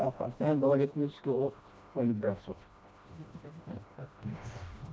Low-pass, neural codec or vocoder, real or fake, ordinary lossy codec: none; codec, 16 kHz, 1 kbps, FreqCodec, smaller model; fake; none